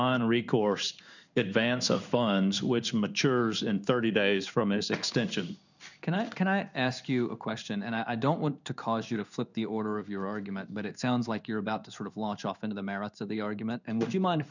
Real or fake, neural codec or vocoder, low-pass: fake; codec, 16 kHz in and 24 kHz out, 1 kbps, XY-Tokenizer; 7.2 kHz